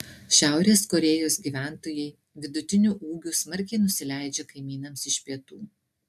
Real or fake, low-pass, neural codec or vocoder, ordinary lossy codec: real; 14.4 kHz; none; AAC, 96 kbps